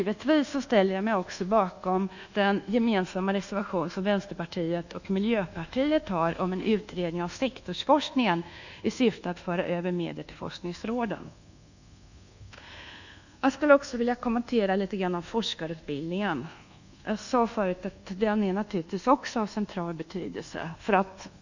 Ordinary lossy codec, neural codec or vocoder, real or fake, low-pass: none; codec, 24 kHz, 1.2 kbps, DualCodec; fake; 7.2 kHz